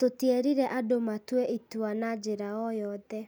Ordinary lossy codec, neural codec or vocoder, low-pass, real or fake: none; none; none; real